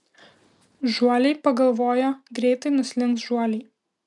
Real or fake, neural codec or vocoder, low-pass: real; none; 10.8 kHz